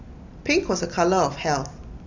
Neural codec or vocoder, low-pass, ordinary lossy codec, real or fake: none; 7.2 kHz; none; real